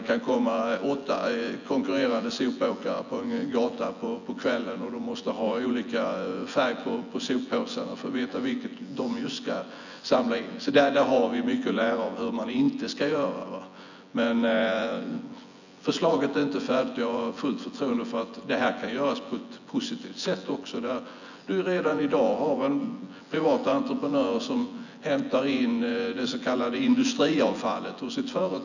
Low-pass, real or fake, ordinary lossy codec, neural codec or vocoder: 7.2 kHz; fake; none; vocoder, 24 kHz, 100 mel bands, Vocos